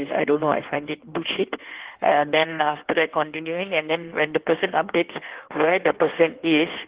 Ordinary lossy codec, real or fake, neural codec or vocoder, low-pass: Opus, 16 kbps; fake; codec, 16 kHz in and 24 kHz out, 1.1 kbps, FireRedTTS-2 codec; 3.6 kHz